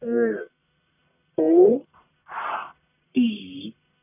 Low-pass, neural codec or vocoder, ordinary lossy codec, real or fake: 3.6 kHz; codec, 44.1 kHz, 1.7 kbps, Pupu-Codec; none; fake